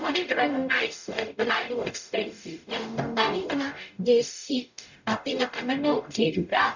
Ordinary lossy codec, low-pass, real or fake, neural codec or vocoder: none; 7.2 kHz; fake; codec, 44.1 kHz, 0.9 kbps, DAC